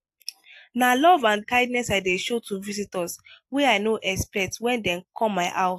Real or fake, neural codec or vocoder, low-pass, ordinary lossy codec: real; none; 14.4 kHz; AAC, 64 kbps